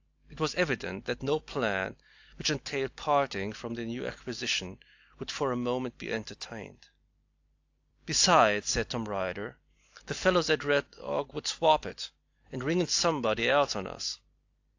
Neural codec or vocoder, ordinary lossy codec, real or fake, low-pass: none; AAC, 48 kbps; real; 7.2 kHz